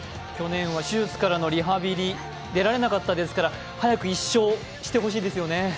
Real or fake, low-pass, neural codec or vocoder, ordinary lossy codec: real; none; none; none